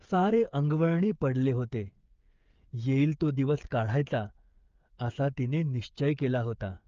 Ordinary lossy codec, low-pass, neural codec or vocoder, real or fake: Opus, 24 kbps; 7.2 kHz; codec, 16 kHz, 8 kbps, FreqCodec, smaller model; fake